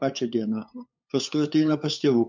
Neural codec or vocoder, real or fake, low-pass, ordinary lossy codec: codec, 16 kHz, 8 kbps, FunCodec, trained on LibriTTS, 25 frames a second; fake; 7.2 kHz; MP3, 48 kbps